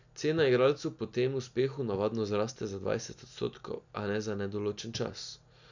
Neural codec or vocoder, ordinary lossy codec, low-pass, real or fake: none; none; 7.2 kHz; real